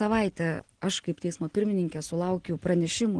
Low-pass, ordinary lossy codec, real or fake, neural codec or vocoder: 9.9 kHz; Opus, 16 kbps; real; none